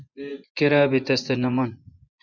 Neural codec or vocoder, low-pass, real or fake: vocoder, 24 kHz, 100 mel bands, Vocos; 7.2 kHz; fake